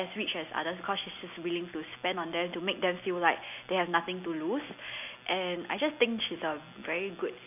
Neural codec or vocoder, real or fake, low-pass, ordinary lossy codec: none; real; 3.6 kHz; none